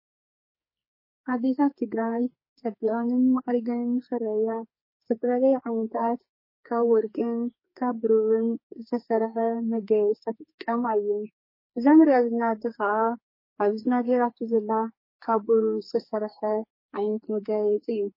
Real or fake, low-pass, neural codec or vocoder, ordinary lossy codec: fake; 5.4 kHz; codec, 44.1 kHz, 2.6 kbps, SNAC; MP3, 24 kbps